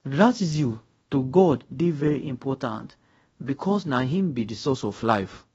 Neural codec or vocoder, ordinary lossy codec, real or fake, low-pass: codec, 24 kHz, 0.5 kbps, DualCodec; AAC, 24 kbps; fake; 10.8 kHz